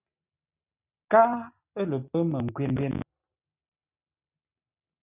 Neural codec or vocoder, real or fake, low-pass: none; real; 3.6 kHz